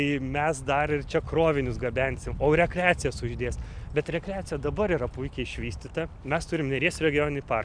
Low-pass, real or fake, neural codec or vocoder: 9.9 kHz; real; none